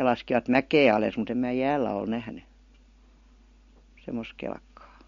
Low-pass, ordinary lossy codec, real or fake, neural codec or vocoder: 7.2 kHz; MP3, 48 kbps; real; none